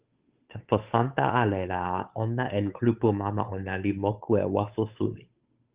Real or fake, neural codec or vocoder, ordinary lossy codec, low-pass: fake; codec, 16 kHz, 8 kbps, FunCodec, trained on Chinese and English, 25 frames a second; Opus, 32 kbps; 3.6 kHz